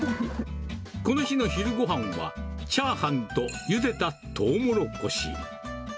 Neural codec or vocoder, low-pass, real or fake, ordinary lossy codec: none; none; real; none